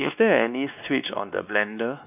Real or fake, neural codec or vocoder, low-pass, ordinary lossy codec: fake; codec, 16 kHz, 2 kbps, X-Codec, WavLM features, trained on Multilingual LibriSpeech; 3.6 kHz; none